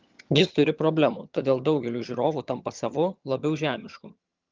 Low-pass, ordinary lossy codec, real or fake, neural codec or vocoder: 7.2 kHz; Opus, 32 kbps; fake; vocoder, 22.05 kHz, 80 mel bands, HiFi-GAN